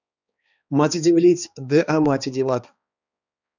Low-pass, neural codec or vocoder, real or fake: 7.2 kHz; codec, 16 kHz, 2 kbps, X-Codec, HuBERT features, trained on balanced general audio; fake